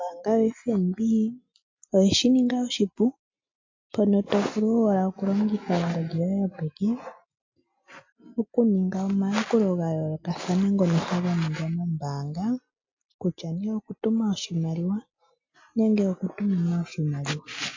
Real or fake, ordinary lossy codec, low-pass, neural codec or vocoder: real; MP3, 48 kbps; 7.2 kHz; none